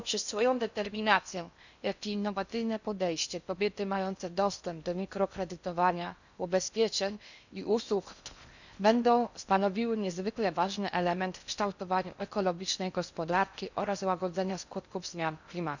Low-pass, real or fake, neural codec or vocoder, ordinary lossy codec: 7.2 kHz; fake; codec, 16 kHz in and 24 kHz out, 0.6 kbps, FocalCodec, streaming, 4096 codes; none